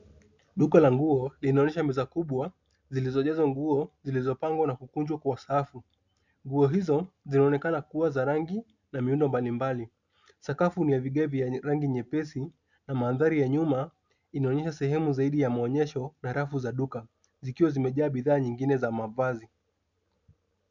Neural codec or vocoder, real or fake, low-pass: none; real; 7.2 kHz